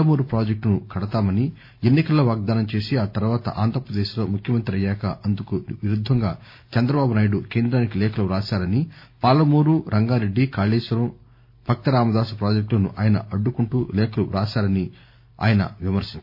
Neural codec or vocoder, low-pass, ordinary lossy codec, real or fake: none; 5.4 kHz; MP3, 32 kbps; real